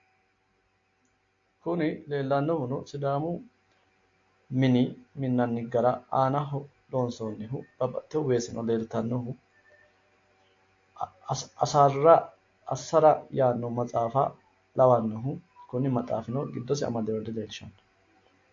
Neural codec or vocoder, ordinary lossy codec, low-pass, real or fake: none; AAC, 48 kbps; 7.2 kHz; real